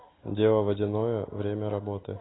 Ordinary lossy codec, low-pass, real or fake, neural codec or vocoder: AAC, 16 kbps; 7.2 kHz; real; none